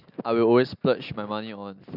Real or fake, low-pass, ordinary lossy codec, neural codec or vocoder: real; 5.4 kHz; none; none